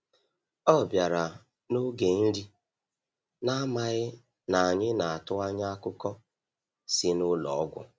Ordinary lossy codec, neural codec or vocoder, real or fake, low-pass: none; none; real; none